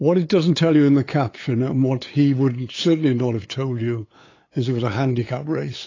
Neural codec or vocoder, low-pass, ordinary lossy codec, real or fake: codec, 16 kHz, 8 kbps, FunCodec, trained on LibriTTS, 25 frames a second; 7.2 kHz; AAC, 32 kbps; fake